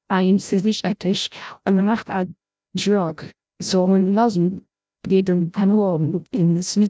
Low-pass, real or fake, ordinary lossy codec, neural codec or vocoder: none; fake; none; codec, 16 kHz, 0.5 kbps, FreqCodec, larger model